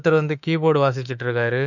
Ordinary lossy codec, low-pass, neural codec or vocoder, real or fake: none; 7.2 kHz; autoencoder, 48 kHz, 32 numbers a frame, DAC-VAE, trained on Japanese speech; fake